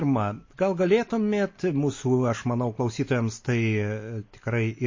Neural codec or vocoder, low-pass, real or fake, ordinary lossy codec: none; 7.2 kHz; real; MP3, 32 kbps